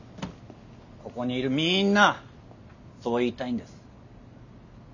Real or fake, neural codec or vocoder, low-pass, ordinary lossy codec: real; none; 7.2 kHz; none